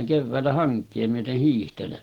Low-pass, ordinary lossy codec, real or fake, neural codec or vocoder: 19.8 kHz; Opus, 16 kbps; real; none